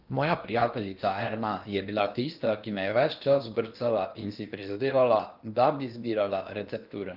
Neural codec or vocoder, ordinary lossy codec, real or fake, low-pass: codec, 16 kHz in and 24 kHz out, 0.8 kbps, FocalCodec, streaming, 65536 codes; Opus, 32 kbps; fake; 5.4 kHz